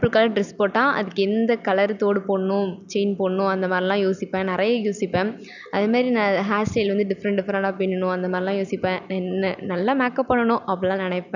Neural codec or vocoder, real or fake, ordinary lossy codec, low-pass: none; real; none; 7.2 kHz